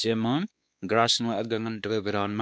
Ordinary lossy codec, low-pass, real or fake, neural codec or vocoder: none; none; fake; codec, 16 kHz, 2 kbps, X-Codec, WavLM features, trained on Multilingual LibriSpeech